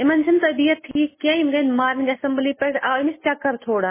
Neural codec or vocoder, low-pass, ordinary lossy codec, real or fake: none; 3.6 kHz; MP3, 16 kbps; real